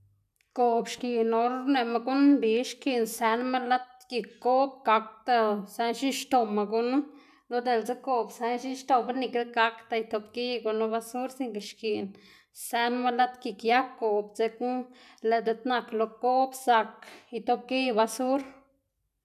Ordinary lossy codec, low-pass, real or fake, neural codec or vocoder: none; 14.4 kHz; real; none